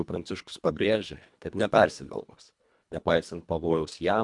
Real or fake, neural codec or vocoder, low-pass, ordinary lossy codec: fake; codec, 24 kHz, 1.5 kbps, HILCodec; 10.8 kHz; MP3, 96 kbps